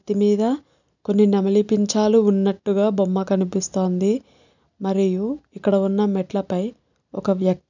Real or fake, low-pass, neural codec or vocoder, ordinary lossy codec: real; 7.2 kHz; none; none